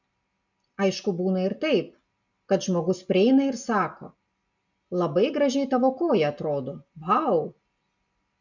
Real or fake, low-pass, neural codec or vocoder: real; 7.2 kHz; none